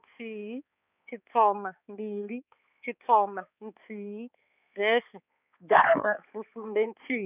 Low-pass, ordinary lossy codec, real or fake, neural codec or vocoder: 3.6 kHz; none; fake; codec, 16 kHz, 4 kbps, X-Codec, HuBERT features, trained on balanced general audio